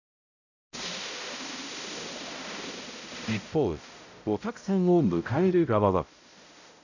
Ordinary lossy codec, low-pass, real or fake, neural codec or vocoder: none; 7.2 kHz; fake; codec, 16 kHz, 0.5 kbps, X-Codec, HuBERT features, trained on balanced general audio